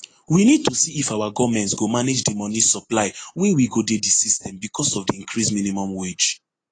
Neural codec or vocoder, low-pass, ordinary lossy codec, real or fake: none; 9.9 kHz; AAC, 32 kbps; real